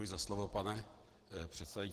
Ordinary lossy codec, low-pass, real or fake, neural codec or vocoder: Opus, 16 kbps; 14.4 kHz; real; none